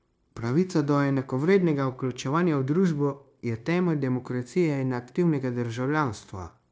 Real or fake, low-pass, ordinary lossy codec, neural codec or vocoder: fake; none; none; codec, 16 kHz, 0.9 kbps, LongCat-Audio-Codec